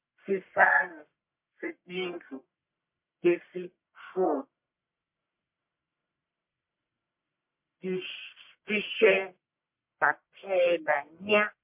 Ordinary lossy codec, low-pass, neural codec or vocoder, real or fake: MP3, 32 kbps; 3.6 kHz; codec, 44.1 kHz, 1.7 kbps, Pupu-Codec; fake